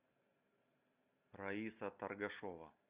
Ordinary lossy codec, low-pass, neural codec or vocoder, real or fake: Opus, 64 kbps; 3.6 kHz; none; real